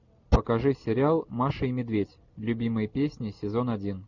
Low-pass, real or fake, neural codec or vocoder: 7.2 kHz; real; none